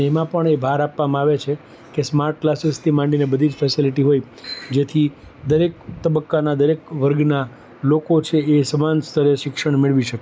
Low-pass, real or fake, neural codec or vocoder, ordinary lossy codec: none; real; none; none